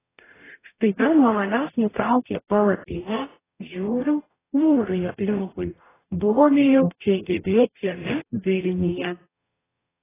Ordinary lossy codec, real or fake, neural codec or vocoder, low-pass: AAC, 16 kbps; fake; codec, 44.1 kHz, 0.9 kbps, DAC; 3.6 kHz